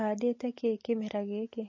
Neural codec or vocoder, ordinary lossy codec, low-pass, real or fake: none; MP3, 32 kbps; 7.2 kHz; real